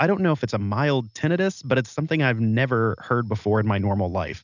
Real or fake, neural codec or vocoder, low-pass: real; none; 7.2 kHz